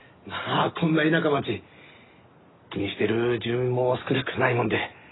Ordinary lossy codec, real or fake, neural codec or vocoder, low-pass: AAC, 16 kbps; real; none; 7.2 kHz